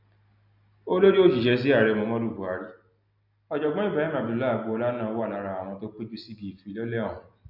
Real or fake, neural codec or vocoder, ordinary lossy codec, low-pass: real; none; none; 5.4 kHz